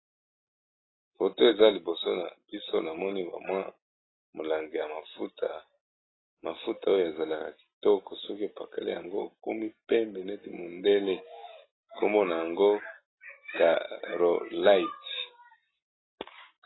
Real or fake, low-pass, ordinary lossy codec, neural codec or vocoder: real; 7.2 kHz; AAC, 16 kbps; none